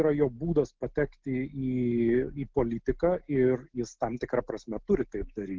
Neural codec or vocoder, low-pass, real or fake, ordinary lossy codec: none; 7.2 kHz; real; Opus, 16 kbps